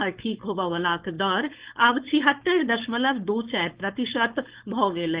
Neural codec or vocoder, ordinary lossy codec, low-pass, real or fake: codec, 16 kHz, 4.8 kbps, FACodec; Opus, 16 kbps; 3.6 kHz; fake